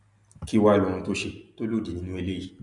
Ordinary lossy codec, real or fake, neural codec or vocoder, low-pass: MP3, 96 kbps; real; none; 10.8 kHz